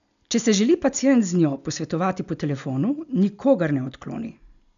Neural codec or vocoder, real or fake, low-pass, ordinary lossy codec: none; real; 7.2 kHz; none